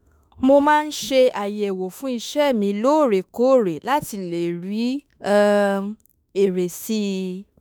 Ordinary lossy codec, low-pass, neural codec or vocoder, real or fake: none; none; autoencoder, 48 kHz, 32 numbers a frame, DAC-VAE, trained on Japanese speech; fake